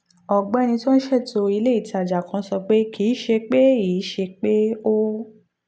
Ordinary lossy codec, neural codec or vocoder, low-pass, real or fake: none; none; none; real